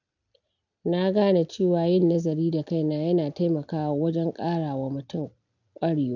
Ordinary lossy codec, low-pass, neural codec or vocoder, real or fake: none; 7.2 kHz; none; real